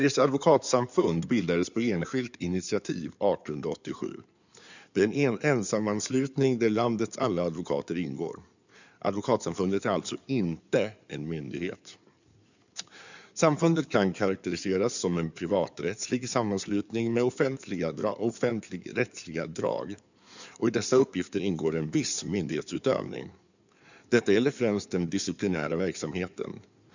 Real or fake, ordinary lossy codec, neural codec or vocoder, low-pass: fake; none; codec, 16 kHz in and 24 kHz out, 2.2 kbps, FireRedTTS-2 codec; 7.2 kHz